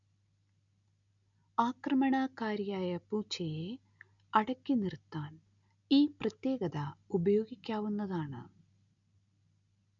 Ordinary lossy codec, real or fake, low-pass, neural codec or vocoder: none; real; 7.2 kHz; none